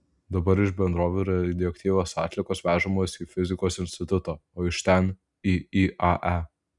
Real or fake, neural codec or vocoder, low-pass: real; none; 10.8 kHz